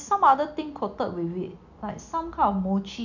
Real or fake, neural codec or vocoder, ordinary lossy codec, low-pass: real; none; none; 7.2 kHz